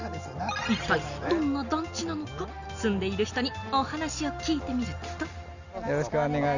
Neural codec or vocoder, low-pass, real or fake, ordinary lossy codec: none; 7.2 kHz; real; none